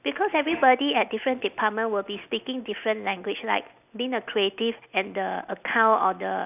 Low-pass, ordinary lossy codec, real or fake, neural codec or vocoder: 3.6 kHz; none; real; none